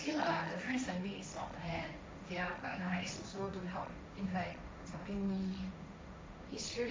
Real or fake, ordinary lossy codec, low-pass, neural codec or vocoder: fake; none; none; codec, 16 kHz, 1.1 kbps, Voila-Tokenizer